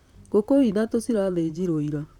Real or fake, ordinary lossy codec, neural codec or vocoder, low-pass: fake; none; vocoder, 44.1 kHz, 128 mel bands, Pupu-Vocoder; 19.8 kHz